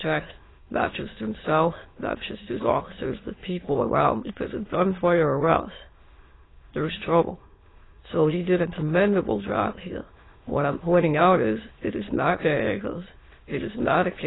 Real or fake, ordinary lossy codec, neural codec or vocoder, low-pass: fake; AAC, 16 kbps; autoencoder, 22.05 kHz, a latent of 192 numbers a frame, VITS, trained on many speakers; 7.2 kHz